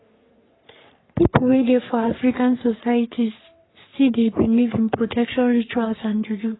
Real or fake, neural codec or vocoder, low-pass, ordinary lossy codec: fake; codec, 44.1 kHz, 3.4 kbps, Pupu-Codec; 7.2 kHz; AAC, 16 kbps